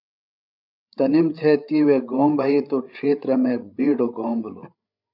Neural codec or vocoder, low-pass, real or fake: codec, 16 kHz, 8 kbps, FreqCodec, larger model; 5.4 kHz; fake